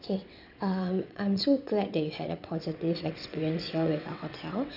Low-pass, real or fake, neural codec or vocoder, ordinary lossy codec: 5.4 kHz; fake; vocoder, 22.05 kHz, 80 mel bands, WaveNeXt; none